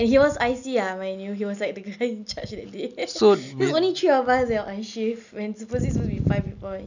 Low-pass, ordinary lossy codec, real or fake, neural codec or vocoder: 7.2 kHz; none; real; none